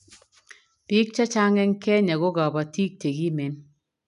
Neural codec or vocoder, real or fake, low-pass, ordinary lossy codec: none; real; 10.8 kHz; none